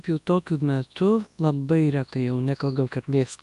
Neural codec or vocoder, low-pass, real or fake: codec, 24 kHz, 0.9 kbps, WavTokenizer, large speech release; 10.8 kHz; fake